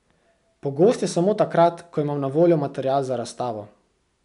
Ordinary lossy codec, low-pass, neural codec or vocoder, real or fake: none; 10.8 kHz; none; real